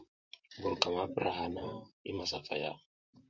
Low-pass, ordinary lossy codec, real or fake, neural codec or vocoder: 7.2 kHz; MP3, 48 kbps; fake; vocoder, 44.1 kHz, 128 mel bands, Pupu-Vocoder